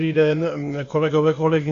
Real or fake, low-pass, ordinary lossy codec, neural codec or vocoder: fake; 7.2 kHz; Opus, 64 kbps; codec, 16 kHz, 0.8 kbps, ZipCodec